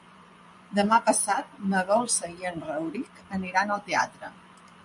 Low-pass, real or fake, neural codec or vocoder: 10.8 kHz; fake; vocoder, 24 kHz, 100 mel bands, Vocos